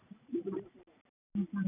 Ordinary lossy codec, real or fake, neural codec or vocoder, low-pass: none; real; none; 3.6 kHz